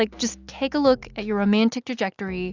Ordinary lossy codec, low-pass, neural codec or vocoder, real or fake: Opus, 64 kbps; 7.2 kHz; none; real